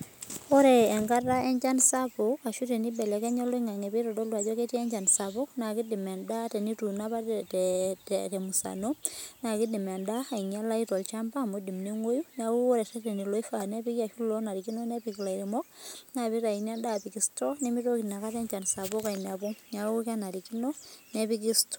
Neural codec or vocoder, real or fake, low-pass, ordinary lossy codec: none; real; none; none